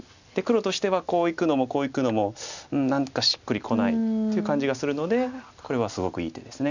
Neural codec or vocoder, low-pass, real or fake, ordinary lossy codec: none; 7.2 kHz; real; none